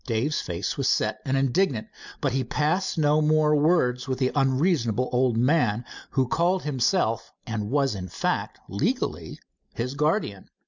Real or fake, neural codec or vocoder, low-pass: real; none; 7.2 kHz